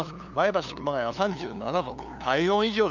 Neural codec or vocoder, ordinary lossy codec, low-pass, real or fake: codec, 16 kHz, 2 kbps, FunCodec, trained on LibriTTS, 25 frames a second; none; 7.2 kHz; fake